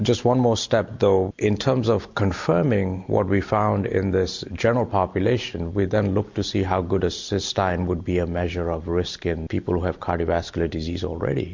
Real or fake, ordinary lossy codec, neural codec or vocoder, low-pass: real; MP3, 48 kbps; none; 7.2 kHz